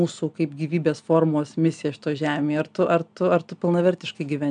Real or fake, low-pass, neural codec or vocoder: real; 9.9 kHz; none